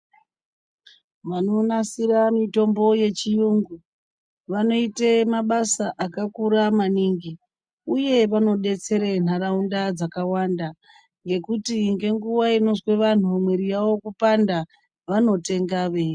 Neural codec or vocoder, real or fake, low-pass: none; real; 9.9 kHz